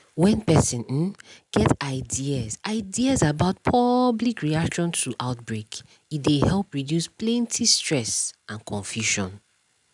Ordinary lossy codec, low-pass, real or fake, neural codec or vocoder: none; 10.8 kHz; real; none